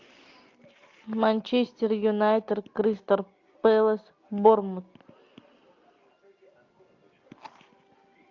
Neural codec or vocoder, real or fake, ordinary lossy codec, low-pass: none; real; AAC, 48 kbps; 7.2 kHz